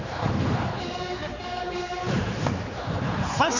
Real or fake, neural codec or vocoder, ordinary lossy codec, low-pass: fake; codec, 16 kHz, 4 kbps, X-Codec, HuBERT features, trained on general audio; none; 7.2 kHz